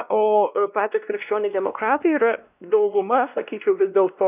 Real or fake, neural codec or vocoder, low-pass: fake; codec, 16 kHz, 1 kbps, X-Codec, WavLM features, trained on Multilingual LibriSpeech; 3.6 kHz